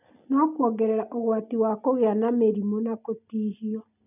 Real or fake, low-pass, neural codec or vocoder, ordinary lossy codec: real; 3.6 kHz; none; none